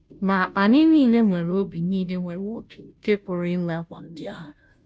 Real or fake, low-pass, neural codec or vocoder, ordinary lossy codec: fake; none; codec, 16 kHz, 0.5 kbps, FunCodec, trained on Chinese and English, 25 frames a second; none